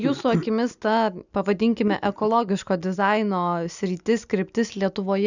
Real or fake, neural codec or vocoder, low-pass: real; none; 7.2 kHz